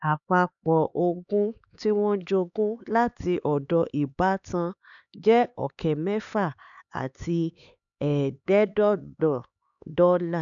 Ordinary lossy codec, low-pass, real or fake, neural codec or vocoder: none; 7.2 kHz; fake; codec, 16 kHz, 4 kbps, X-Codec, HuBERT features, trained on LibriSpeech